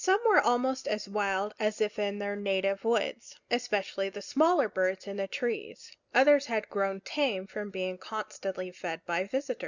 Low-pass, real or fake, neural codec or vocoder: 7.2 kHz; real; none